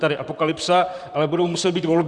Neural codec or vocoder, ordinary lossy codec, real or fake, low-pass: vocoder, 44.1 kHz, 128 mel bands, Pupu-Vocoder; Opus, 64 kbps; fake; 10.8 kHz